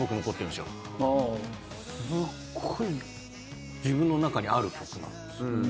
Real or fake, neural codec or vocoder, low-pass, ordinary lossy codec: real; none; none; none